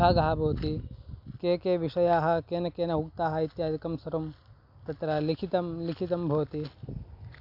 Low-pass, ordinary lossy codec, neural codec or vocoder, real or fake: 5.4 kHz; AAC, 48 kbps; none; real